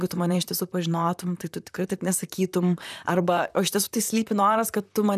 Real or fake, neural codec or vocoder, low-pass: fake; vocoder, 44.1 kHz, 128 mel bands, Pupu-Vocoder; 14.4 kHz